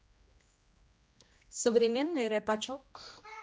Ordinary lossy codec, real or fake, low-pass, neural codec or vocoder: none; fake; none; codec, 16 kHz, 1 kbps, X-Codec, HuBERT features, trained on general audio